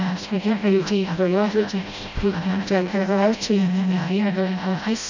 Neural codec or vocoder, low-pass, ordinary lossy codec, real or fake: codec, 16 kHz, 0.5 kbps, FreqCodec, smaller model; 7.2 kHz; none; fake